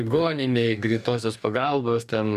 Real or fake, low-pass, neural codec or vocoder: fake; 14.4 kHz; codec, 44.1 kHz, 2.6 kbps, DAC